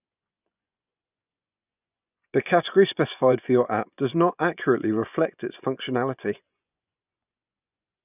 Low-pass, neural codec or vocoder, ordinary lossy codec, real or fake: 3.6 kHz; vocoder, 24 kHz, 100 mel bands, Vocos; none; fake